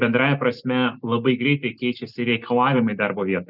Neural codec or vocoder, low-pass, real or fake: none; 5.4 kHz; real